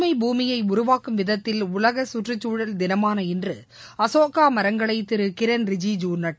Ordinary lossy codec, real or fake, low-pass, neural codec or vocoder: none; real; none; none